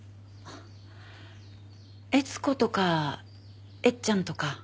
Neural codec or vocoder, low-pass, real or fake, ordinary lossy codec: none; none; real; none